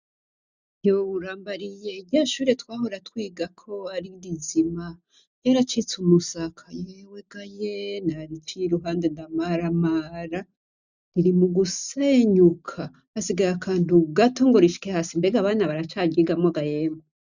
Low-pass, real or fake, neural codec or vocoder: 7.2 kHz; real; none